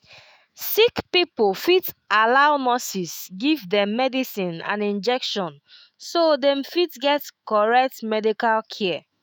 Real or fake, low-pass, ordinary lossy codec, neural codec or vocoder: fake; none; none; autoencoder, 48 kHz, 128 numbers a frame, DAC-VAE, trained on Japanese speech